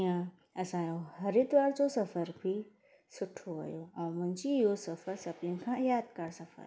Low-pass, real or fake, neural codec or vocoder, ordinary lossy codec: none; real; none; none